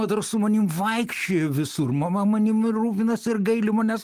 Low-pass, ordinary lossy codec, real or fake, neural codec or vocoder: 14.4 kHz; Opus, 24 kbps; real; none